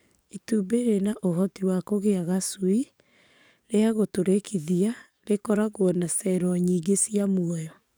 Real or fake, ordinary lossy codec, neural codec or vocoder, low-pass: fake; none; codec, 44.1 kHz, 7.8 kbps, DAC; none